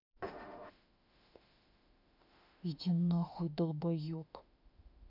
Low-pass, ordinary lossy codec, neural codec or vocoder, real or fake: 5.4 kHz; none; autoencoder, 48 kHz, 32 numbers a frame, DAC-VAE, trained on Japanese speech; fake